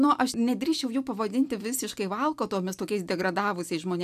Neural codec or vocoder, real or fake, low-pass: none; real; 14.4 kHz